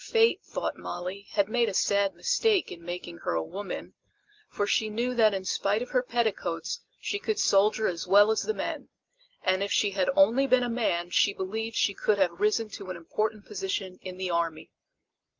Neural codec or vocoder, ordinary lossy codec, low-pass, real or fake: none; Opus, 32 kbps; 7.2 kHz; real